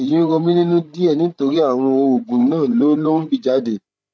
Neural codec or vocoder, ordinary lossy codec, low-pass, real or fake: codec, 16 kHz, 8 kbps, FreqCodec, larger model; none; none; fake